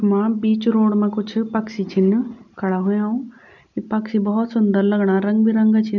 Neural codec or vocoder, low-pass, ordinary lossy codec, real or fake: none; 7.2 kHz; none; real